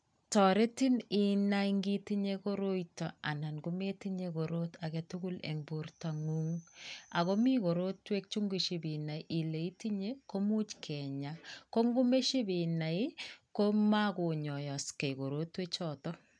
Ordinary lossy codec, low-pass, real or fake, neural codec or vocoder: none; 9.9 kHz; real; none